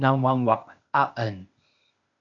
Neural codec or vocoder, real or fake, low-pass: codec, 16 kHz, 0.8 kbps, ZipCodec; fake; 7.2 kHz